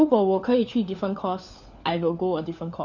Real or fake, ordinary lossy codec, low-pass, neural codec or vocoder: fake; none; 7.2 kHz; codec, 16 kHz, 4 kbps, FunCodec, trained on LibriTTS, 50 frames a second